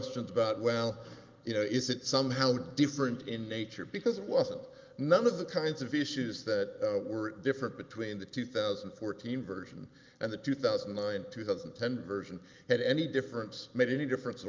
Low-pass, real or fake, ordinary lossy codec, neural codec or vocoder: 7.2 kHz; real; Opus, 24 kbps; none